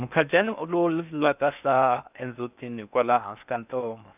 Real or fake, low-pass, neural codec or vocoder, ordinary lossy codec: fake; 3.6 kHz; codec, 16 kHz in and 24 kHz out, 0.6 kbps, FocalCodec, streaming, 4096 codes; none